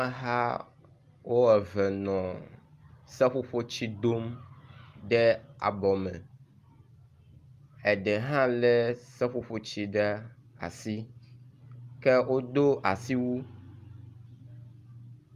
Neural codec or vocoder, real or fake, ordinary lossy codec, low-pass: none; real; Opus, 32 kbps; 14.4 kHz